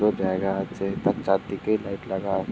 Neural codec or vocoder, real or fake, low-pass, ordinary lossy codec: none; real; none; none